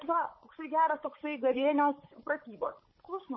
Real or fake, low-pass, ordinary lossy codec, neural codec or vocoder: fake; 7.2 kHz; MP3, 24 kbps; codec, 16 kHz, 16 kbps, FunCodec, trained on Chinese and English, 50 frames a second